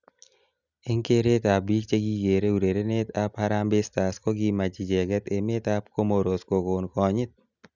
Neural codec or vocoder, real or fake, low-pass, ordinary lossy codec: none; real; 7.2 kHz; none